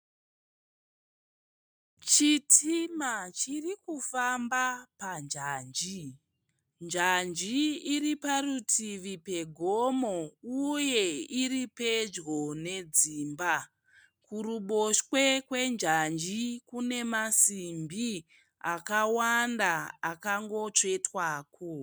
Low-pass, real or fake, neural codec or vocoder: 19.8 kHz; real; none